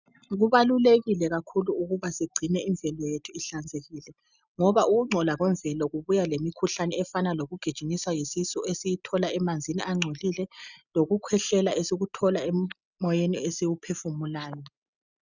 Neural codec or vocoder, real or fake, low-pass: none; real; 7.2 kHz